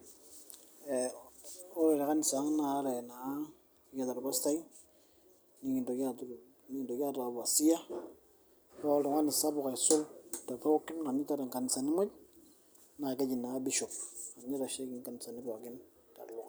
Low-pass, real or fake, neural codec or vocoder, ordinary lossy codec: none; real; none; none